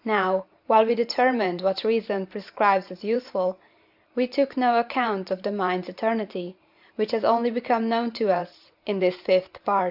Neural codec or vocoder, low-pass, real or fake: vocoder, 44.1 kHz, 128 mel bands every 512 samples, BigVGAN v2; 5.4 kHz; fake